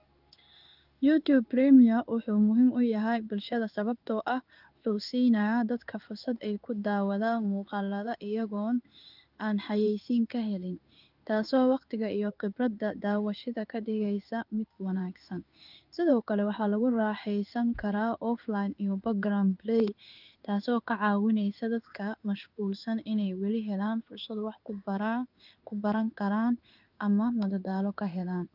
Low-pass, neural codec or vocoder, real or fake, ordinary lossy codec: 5.4 kHz; codec, 16 kHz in and 24 kHz out, 1 kbps, XY-Tokenizer; fake; Opus, 24 kbps